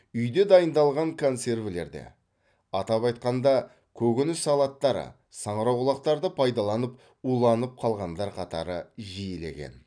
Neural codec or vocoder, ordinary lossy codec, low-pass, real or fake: none; none; 9.9 kHz; real